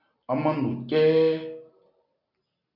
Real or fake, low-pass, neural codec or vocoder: real; 5.4 kHz; none